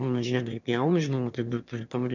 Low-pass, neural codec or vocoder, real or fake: 7.2 kHz; autoencoder, 22.05 kHz, a latent of 192 numbers a frame, VITS, trained on one speaker; fake